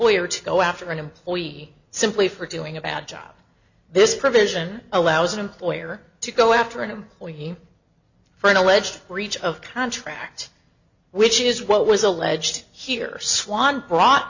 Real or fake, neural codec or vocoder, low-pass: real; none; 7.2 kHz